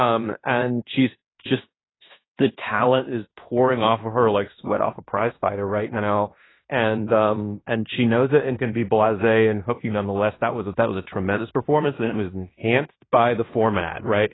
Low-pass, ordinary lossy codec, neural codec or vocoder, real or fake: 7.2 kHz; AAC, 16 kbps; codec, 16 kHz in and 24 kHz out, 0.9 kbps, LongCat-Audio-Codec, fine tuned four codebook decoder; fake